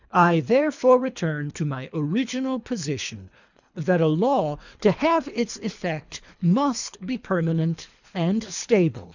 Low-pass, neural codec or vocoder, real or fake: 7.2 kHz; codec, 24 kHz, 3 kbps, HILCodec; fake